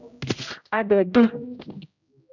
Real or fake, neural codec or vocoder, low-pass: fake; codec, 16 kHz, 0.5 kbps, X-Codec, HuBERT features, trained on general audio; 7.2 kHz